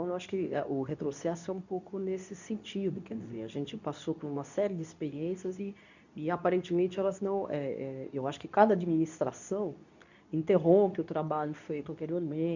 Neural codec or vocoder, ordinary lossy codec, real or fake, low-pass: codec, 24 kHz, 0.9 kbps, WavTokenizer, medium speech release version 2; none; fake; 7.2 kHz